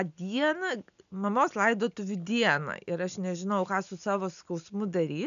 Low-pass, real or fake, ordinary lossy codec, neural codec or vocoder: 7.2 kHz; real; MP3, 96 kbps; none